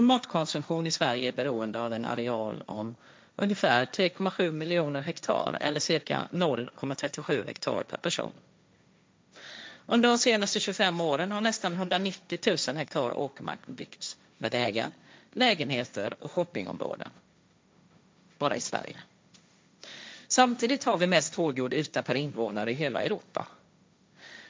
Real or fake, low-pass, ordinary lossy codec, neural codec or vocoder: fake; none; none; codec, 16 kHz, 1.1 kbps, Voila-Tokenizer